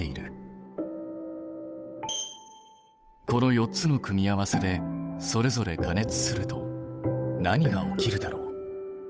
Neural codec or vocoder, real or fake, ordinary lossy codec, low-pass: codec, 16 kHz, 8 kbps, FunCodec, trained on Chinese and English, 25 frames a second; fake; none; none